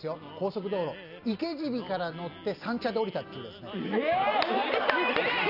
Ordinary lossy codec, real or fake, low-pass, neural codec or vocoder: none; real; 5.4 kHz; none